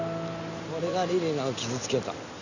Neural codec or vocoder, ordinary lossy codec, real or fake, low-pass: none; none; real; 7.2 kHz